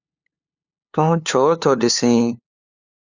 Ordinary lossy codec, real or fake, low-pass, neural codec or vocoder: Opus, 64 kbps; fake; 7.2 kHz; codec, 16 kHz, 2 kbps, FunCodec, trained on LibriTTS, 25 frames a second